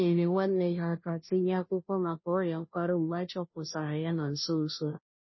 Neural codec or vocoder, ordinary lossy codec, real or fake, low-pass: codec, 16 kHz, 0.5 kbps, FunCodec, trained on Chinese and English, 25 frames a second; MP3, 24 kbps; fake; 7.2 kHz